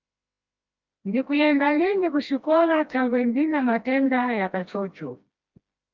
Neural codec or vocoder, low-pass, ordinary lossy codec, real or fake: codec, 16 kHz, 1 kbps, FreqCodec, smaller model; 7.2 kHz; Opus, 24 kbps; fake